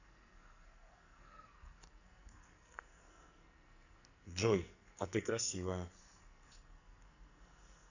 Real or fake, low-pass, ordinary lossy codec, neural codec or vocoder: fake; 7.2 kHz; none; codec, 44.1 kHz, 2.6 kbps, SNAC